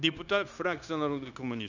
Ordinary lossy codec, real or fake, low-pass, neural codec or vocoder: none; fake; 7.2 kHz; codec, 16 kHz, 0.9 kbps, LongCat-Audio-Codec